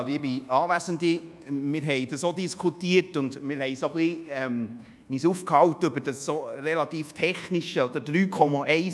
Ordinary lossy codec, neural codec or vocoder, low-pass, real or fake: none; codec, 24 kHz, 1.2 kbps, DualCodec; none; fake